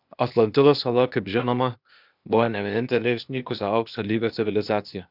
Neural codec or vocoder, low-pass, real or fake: codec, 16 kHz, 0.8 kbps, ZipCodec; 5.4 kHz; fake